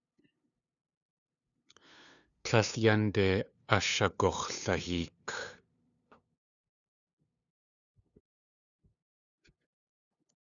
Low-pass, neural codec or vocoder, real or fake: 7.2 kHz; codec, 16 kHz, 2 kbps, FunCodec, trained on LibriTTS, 25 frames a second; fake